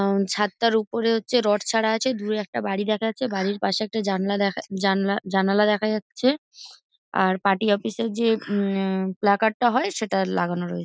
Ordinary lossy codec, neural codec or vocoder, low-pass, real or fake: none; none; none; real